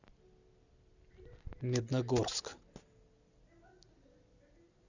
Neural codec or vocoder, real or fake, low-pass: none; real; 7.2 kHz